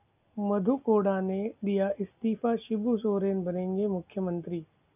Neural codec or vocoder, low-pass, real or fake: none; 3.6 kHz; real